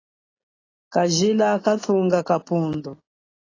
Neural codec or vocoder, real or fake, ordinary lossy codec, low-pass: none; real; AAC, 32 kbps; 7.2 kHz